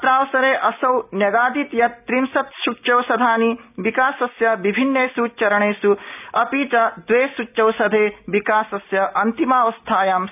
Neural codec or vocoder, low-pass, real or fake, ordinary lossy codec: none; 3.6 kHz; real; none